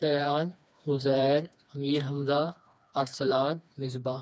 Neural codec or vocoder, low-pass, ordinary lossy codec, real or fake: codec, 16 kHz, 2 kbps, FreqCodec, smaller model; none; none; fake